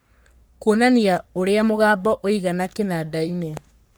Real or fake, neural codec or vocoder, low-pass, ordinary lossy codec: fake; codec, 44.1 kHz, 3.4 kbps, Pupu-Codec; none; none